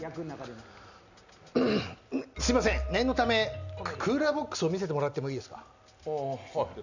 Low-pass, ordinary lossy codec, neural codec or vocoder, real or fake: 7.2 kHz; none; none; real